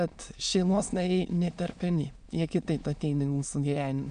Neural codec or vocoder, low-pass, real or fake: autoencoder, 22.05 kHz, a latent of 192 numbers a frame, VITS, trained on many speakers; 9.9 kHz; fake